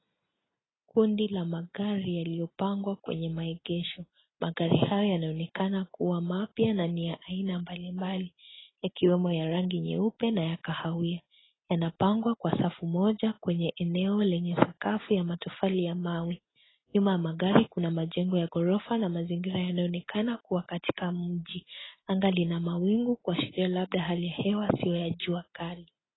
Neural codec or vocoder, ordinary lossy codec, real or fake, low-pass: none; AAC, 16 kbps; real; 7.2 kHz